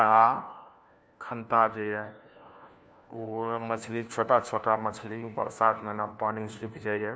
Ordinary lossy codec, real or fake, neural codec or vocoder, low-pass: none; fake; codec, 16 kHz, 1 kbps, FunCodec, trained on LibriTTS, 50 frames a second; none